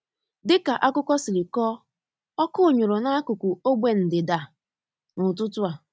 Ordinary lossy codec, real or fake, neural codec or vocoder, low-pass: none; real; none; none